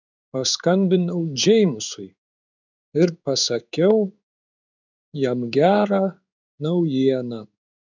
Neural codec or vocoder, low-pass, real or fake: codec, 16 kHz in and 24 kHz out, 1 kbps, XY-Tokenizer; 7.2 kHz; fake